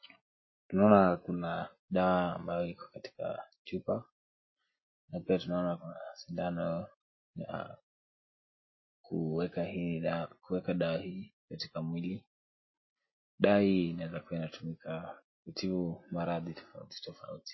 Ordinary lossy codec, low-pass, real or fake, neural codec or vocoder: MP3, 24 kbps; 7.2 kHz; real; none